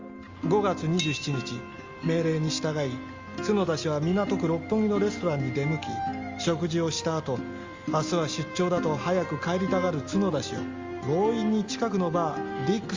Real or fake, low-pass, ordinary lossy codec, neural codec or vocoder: real; 7.2 kHz; Opus, 32 kbps; none